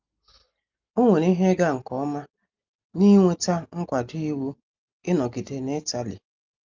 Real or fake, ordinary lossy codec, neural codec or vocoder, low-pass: real; Opus, 32 kbps; none; 7.2 kHz